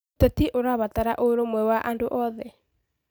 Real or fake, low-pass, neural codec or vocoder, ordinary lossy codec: real; none; none; none